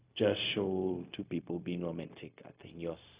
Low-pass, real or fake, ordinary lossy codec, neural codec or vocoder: 3.6 kHz; fake; Opus, 64 kbps; codec, 16 kHz, 0.4 kbps, LongCat-Audio-Codec